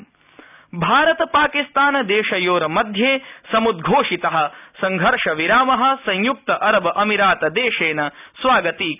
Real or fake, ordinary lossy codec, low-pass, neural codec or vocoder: real; none; 3.6 kHz; none